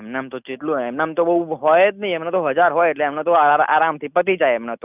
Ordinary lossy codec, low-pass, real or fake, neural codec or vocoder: none; 3.6 kHz; real; none